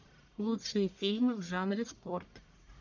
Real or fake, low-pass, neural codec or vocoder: fake; 7.2 kHz; codec, 44.1 kHz, 1.7 kbps, Pupu-Codec